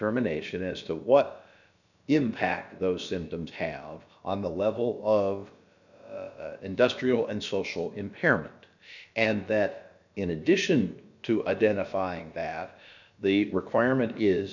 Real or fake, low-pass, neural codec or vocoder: fake; 7.2 kHz; codec, 16 kHz, about 1 kbps, DyCAST, with the encoder's durations